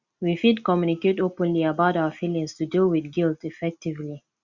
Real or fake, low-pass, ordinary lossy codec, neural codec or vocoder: real; 7.2 kHz; Opus, 64 kbps; none